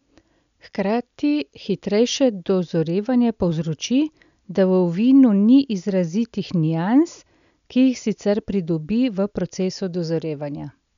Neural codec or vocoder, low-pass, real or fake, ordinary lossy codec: none; 7.2 kHz; real; none